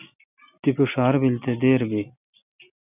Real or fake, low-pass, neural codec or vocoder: real; 3.6 kHz; none